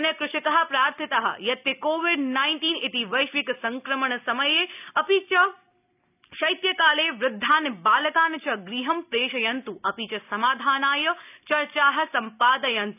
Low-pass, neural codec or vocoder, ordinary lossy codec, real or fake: 3.6 kHz; none; none; real